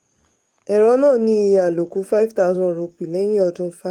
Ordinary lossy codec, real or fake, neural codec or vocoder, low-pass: Opus, 24 kbps; fake; codec, 44.1 kHz, 7.8 kbps, DAC; 19.8 kHz